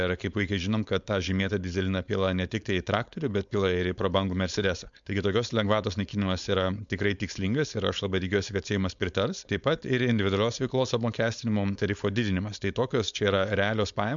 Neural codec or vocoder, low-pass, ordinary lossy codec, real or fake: codec, 16 kHz, 4.8 kbps, FACodec; 7.2 kHz; MP3, 64 kbps; fake